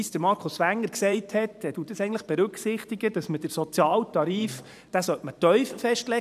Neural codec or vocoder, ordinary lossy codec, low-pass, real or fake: vocoder, 44.1 kHz, 128 mel bands every 512 samples, BigVGAN v2; none; 14.4 kHz; fake